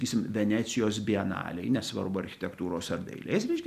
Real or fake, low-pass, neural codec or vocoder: real; 14.4 kHz; none